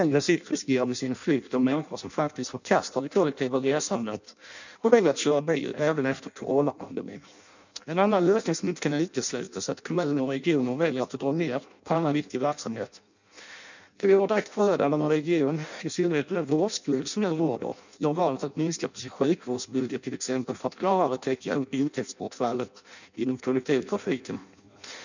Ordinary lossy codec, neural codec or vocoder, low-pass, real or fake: none; codec, 16 kHz in and 24 kHz out, 0.6 kbps, FireRedTTS-2 codec; 7.2 kHz; fake